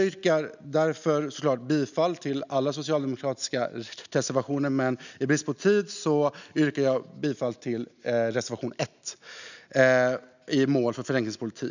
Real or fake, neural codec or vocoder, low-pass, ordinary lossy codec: real; none; 7.2 kHz; none